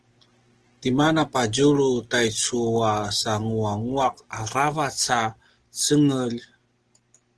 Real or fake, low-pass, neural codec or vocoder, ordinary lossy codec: real; 10.8 kHz; none; Opus, 16 kbps